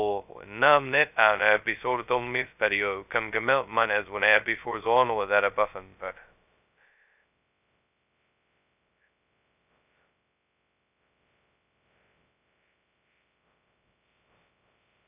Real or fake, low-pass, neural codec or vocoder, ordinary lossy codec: fake; 3.6 kHz; codec, 16 kHz, 0.2 kbps, FocalCodec; none